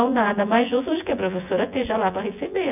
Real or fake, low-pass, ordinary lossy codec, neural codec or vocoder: fake; 3.6 kHz; none; vocoder, 24 kHz, 100 mel bands, Vocos